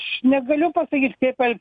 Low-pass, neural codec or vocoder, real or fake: 9.9 kHz; none; real